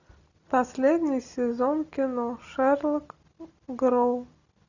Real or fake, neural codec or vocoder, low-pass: real; none; 7.2 kHz